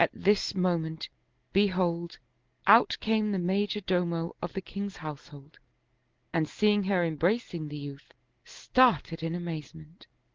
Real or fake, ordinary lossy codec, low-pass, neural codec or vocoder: real; Opus, 16 kbps; 7.2 kHz; none